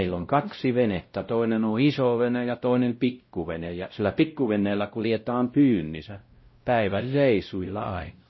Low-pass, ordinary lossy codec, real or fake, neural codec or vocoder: 7.2 kHz; MP3, 24 kbps; fake; codec, 16 kHz, 0.5 kbps, X-Codec, WavLM features, trained on Multilingual LibriSpeech